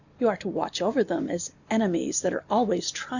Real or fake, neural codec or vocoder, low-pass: real; none; 7.2 kHz